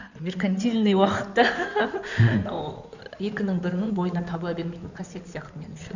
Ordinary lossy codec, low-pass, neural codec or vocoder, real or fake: none; 7.2 kHz; codec, 16 kHz in and 24 kHz out, 2.2 kbps, FireRedTTS-2 codec; fake